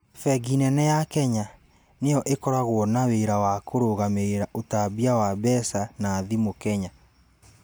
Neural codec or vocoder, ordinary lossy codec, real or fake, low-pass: none; none; real; none